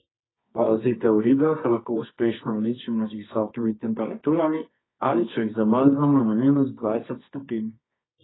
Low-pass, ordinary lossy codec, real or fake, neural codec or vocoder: 7.2 kHz; AAC, 16 kbps; fake; codec, 24 kHz, 0.9 kbps, WavTokenizer, medium music audio release